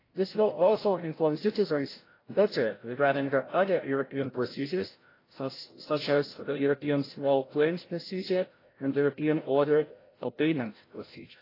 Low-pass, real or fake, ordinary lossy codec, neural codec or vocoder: 5.4 kHz; fake; AAC, 24 kbps; codec, 16 kHz, 0.5 kbps, FreqCodec, larger model